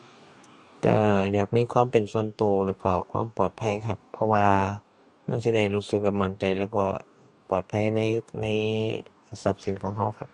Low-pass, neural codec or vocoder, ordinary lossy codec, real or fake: 10.8 kHz; codec, 44.1 kHz, 2.6 kbps, DAC; none; fake